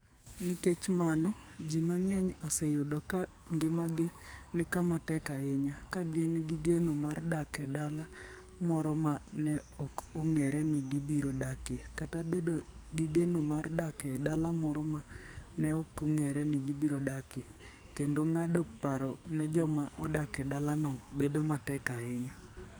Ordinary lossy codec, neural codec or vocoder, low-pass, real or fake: none; codec, 44.1 kHz, 2.6 kbps, SNAC; none; fake